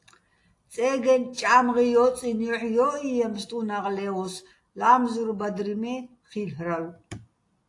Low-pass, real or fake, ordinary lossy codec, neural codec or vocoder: 10.8 kHz; real; AAC, 48 kbps; none